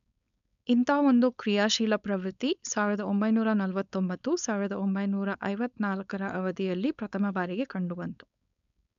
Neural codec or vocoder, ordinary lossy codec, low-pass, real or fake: codec, 16 kHz, 4.8 kbps, FACodec; none; 7.2 kHz; fake